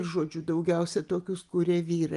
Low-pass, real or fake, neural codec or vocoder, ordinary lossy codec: 10.8 kHz; real; none; Opus, 32 kbps